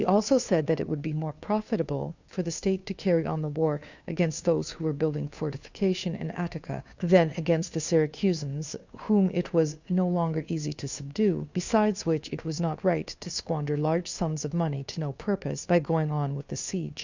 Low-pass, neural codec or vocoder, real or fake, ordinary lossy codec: 7.2 kHz; codec, 16 kHz, 2 kbps, FunCodec, trained on Chinese and English, 25 frames a second; fake; Opus, 64 kbps